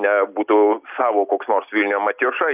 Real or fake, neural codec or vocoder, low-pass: real; none; 3.6 kHz